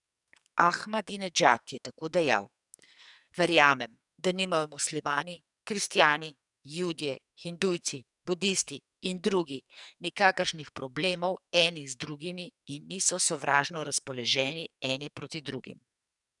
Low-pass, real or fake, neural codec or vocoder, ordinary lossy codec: 10.8 kHz; fake; codec, 44.1 kHz, 2.6 kbps, SNAC; none